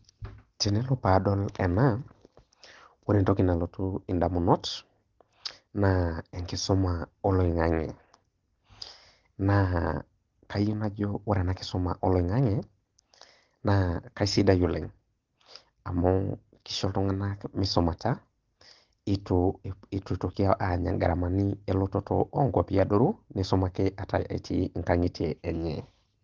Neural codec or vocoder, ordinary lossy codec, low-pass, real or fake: none; Opus, 16 kbps; 7.2 kHz; real